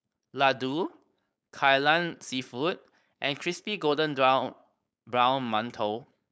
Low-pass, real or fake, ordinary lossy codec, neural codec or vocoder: none; fake; none; codec, 16 kHz, 4.8 kbps, FACodec